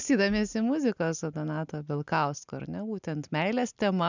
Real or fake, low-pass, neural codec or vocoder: real; 7.2 kHz; none